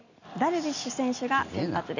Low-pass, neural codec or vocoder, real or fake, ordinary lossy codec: 7.2 kHz; none; real; none